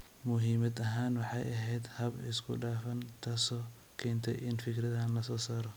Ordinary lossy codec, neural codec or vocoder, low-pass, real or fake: none; none; none; real